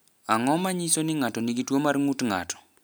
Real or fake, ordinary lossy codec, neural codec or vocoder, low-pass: real; none; none; none